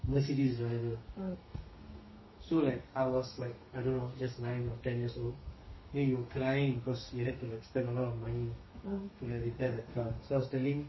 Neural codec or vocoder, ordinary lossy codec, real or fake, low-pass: codec, 32 kHz, 1.9 kbps, SNAC; MP3, 24 kbps; fake; 7.2 kHz